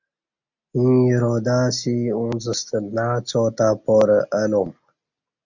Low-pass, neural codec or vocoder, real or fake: 7.2 kHz; none; real